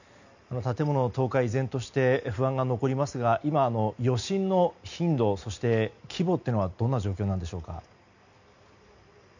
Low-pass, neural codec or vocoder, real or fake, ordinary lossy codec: 7.2 kHz; none; real; none